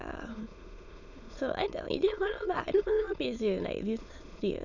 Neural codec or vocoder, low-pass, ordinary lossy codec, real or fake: autoencoder, 22.05 kHz, a latent of 192 numbers a frame, VITS, trained on many speakers; 7.2 kHz; none; fake